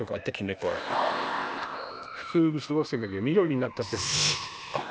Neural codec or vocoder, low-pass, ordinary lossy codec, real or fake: codec, 16 kHz, 0.8 kbps, ZipCodec; none; none; fake